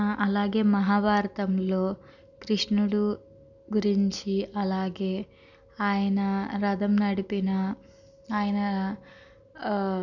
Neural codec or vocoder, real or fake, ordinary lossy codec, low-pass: none; real; none; 7.2 kHz